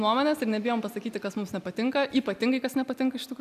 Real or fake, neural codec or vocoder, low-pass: real; none; 14.4 kHz